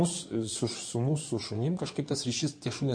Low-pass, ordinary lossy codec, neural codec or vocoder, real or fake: 9.9 kHz; MP3, 48 kbps; codec, 16 kHz in and 24 kHz out, 2.2 kbps, FireRedTTS-2 codec; fake